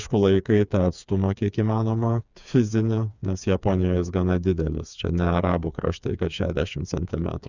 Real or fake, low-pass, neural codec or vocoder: fake; 7.2 kHz; codec, 16 kHz, 4 kbps, FreqCodec, smaller model